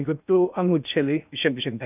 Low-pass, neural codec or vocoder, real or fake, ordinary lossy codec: 3.6 kHz; codec, 16 kHz in and 24 kHz out, 0.6 kbps, FocalCodec, streaming, 2048 codes; fake; none